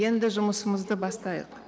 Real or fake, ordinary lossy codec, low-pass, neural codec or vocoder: real; none; none; none